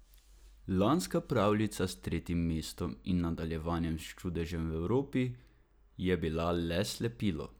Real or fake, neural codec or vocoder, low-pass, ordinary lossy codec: real; none; none; none